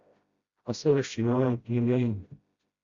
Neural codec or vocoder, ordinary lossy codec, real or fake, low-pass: codec, 16 kHz, 0.5 kbps, FreqCodec, smaller model; Opus, 64 kbps; fake; 7.2 kHz